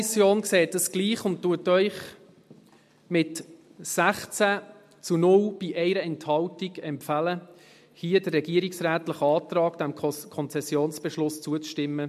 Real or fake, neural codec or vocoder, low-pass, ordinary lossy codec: real; none; 14.4 kHz; MP3, 64 kbps